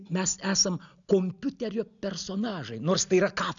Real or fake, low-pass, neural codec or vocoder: real; 7.2 kHz; none